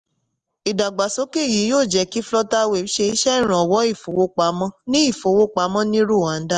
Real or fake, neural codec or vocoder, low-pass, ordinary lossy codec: real; none; 10.8 kHz; Opus, 32 kbps